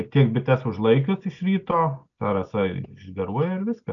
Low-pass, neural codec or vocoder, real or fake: 7.2 kHz; none; real